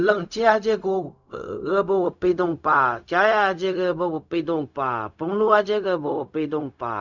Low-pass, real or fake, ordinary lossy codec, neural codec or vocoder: 7.2 kHz; fake; none; codec, 16 kHz, 0.4 kbps, LongCat-Audio-Codec